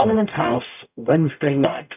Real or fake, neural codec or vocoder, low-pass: fake; codec, 44.1 kHz, 0.9 kbps, DAC; 3.6 kHz